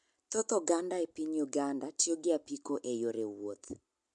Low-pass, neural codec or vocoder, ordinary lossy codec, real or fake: 10.8 kHz; none; MP3, 64 kbps; real